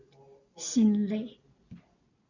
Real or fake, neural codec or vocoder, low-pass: real; none; 7.2 kHz